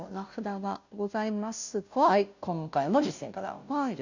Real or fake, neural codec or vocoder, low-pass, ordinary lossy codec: fake; codec, 16 kHz, 0.5 kbps, FunCodec, trained on Chinese and English, 25 frames a second; 7.2 kHz; none